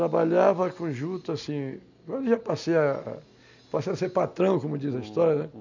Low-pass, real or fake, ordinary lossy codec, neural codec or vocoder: 7.2 kHz; real; none; none